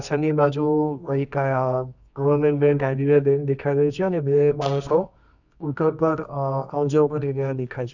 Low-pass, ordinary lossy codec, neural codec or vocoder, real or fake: 7.2 kHz; none; codec, 24 kHz, 0.9 kbps, WavTokenizer, medium music audio release; fake